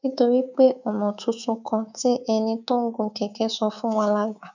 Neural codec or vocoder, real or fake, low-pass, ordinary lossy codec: codec, 24 kHz, 3.1 kbps, DualCodec; fake; 7.2 kHz; none